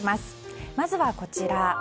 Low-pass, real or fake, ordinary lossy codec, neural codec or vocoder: none; real; none; none